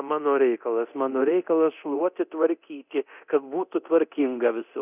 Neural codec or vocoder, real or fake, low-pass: codec, 24 kHz, 0.9 kbps, DualCodec; fake; 3.6 kHz